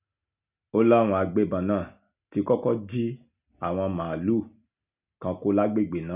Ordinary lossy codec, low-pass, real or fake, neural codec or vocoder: none; 3.6 kHz; real; none